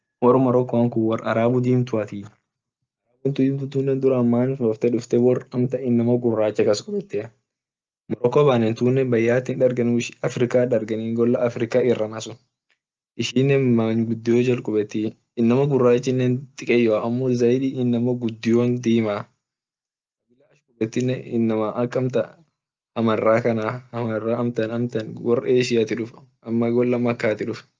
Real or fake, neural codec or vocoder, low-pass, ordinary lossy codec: real; none; 7.2 kHz; Opus, 24 kbps